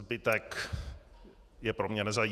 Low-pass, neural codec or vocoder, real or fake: 14.4 kHz; vocoder, 48 kHz, 128 mel bands, Vocos; fake